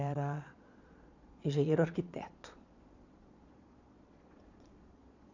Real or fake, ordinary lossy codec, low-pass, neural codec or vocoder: fake; none; 7.2 kHz; vocoder, 22.05 kHz, 80 mel bands, Vocos